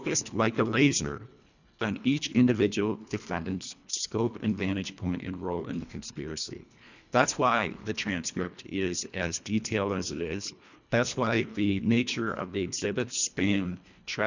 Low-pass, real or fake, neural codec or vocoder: 7.2 kHz; fake; codec, 24 kHz, 1.5 kbps, HILCodec